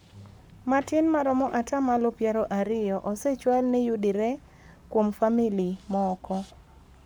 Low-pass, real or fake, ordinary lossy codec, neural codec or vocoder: none; fake; none; codec, 44.1 kHz, 7.8 kbps, Pupu-Codec